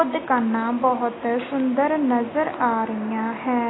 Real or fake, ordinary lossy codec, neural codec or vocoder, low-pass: real; AAC, 16 kbps; none; 7.2 kHz